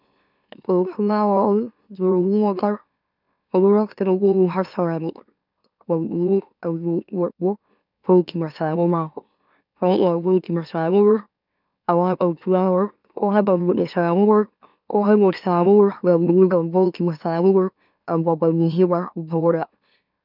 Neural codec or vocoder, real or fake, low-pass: autoencoder, 44.1 kHz, a latent of 192 numbers a frame, MeloTTS; fake; 5.4 kHz